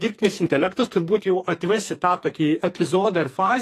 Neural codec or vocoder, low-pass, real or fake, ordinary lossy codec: codec, 44.1 kHz, 2.6 kbps, SNAC; 14.4 kHz; fake; AAC, 48 kbps